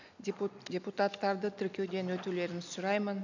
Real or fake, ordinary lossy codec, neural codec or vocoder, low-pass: real; none; none; 7.2 kHz